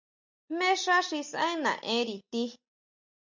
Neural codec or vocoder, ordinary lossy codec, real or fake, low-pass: none; MP3, 64 kbps; real; 7.2 kHz